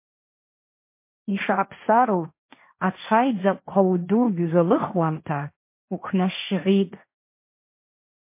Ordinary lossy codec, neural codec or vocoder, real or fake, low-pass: MP3, 24 kbps; codec, 16 kHz, 1.1 kbps, Voila-Tokenizer; fake; 3.6 kHz